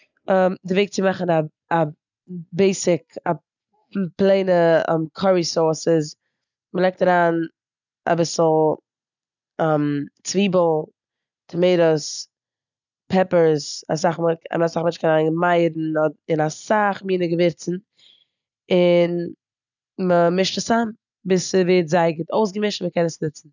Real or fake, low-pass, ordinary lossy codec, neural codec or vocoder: real; 7.2 kHz; none; none